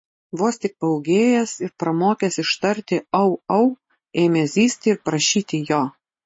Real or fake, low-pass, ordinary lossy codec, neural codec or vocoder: real; 9.9 kHz; MP3, 32 kbps; none